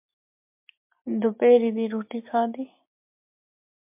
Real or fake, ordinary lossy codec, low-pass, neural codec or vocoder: real; MP3, 32 kbps; 3.6 kHz; none